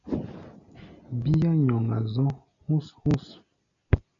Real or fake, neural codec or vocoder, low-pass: real; none; 7.2 kHz